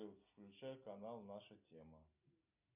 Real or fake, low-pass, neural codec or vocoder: real; 3.6 kHz; none